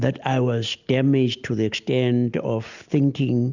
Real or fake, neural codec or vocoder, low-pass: real; none; 7.2 kHz